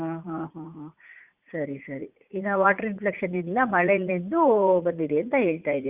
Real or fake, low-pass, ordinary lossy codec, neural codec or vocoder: fake; 3.6 kHz; Opus, 64 kbps; vocoder, 44.1 kHz, 80 mel bands, Vocos